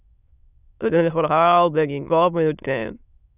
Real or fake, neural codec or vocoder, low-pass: fake; autoencoder, 22.05 kHz, a latent of 192 numbers a frame, VITS, trained on many speakers; 3.6 kHz